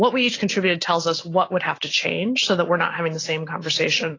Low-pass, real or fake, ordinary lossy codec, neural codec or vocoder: 7.2 kHz; real; AAC, 32 kbps; none